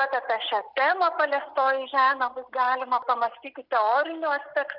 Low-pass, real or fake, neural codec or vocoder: 5.4 kHz; real; none